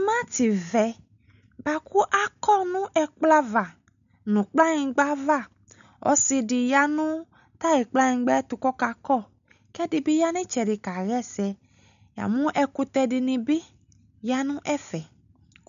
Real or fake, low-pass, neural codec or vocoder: real; 7.2 kHz; none